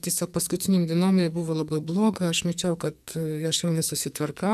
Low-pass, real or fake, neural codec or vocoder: 14.4 kHz; fake; codec, 44.1 kHz, 2.6 kbps, SNAC